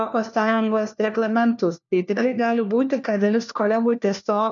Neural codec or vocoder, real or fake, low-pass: codec, 16 kHz, 1 kbps, FunCodec, trained on LibriTTS, 50 frames a second; fake; 7.2 kHz